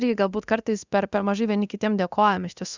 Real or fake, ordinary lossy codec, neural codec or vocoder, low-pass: fake; Opus, 64 kbps; codec, 24 kHz, 0.9 kbps, DualCodec; 7.2 kHz